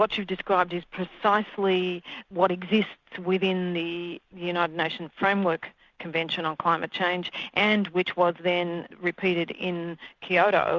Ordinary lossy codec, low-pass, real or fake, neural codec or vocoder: Opus, 64 kbps; 7.2 kHz; real; none